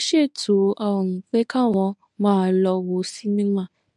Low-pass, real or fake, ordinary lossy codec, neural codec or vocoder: 10.8 kHz; fake; none; codec, 24 kHz, 0.9 kbps, WavTokenizer, medium speech release version 1